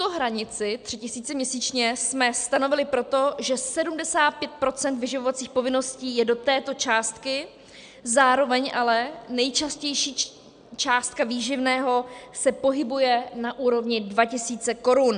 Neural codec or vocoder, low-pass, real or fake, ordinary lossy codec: none; 9.9 kHz; real; MP3, 96 kbps